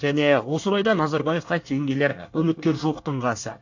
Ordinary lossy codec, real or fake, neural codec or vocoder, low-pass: AAC, 48 kbps; fake; codec, 24 kHz, 1 kbps, SNAC; 7.2 kHz